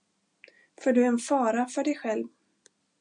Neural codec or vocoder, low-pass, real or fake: none; 9.9 kHz; real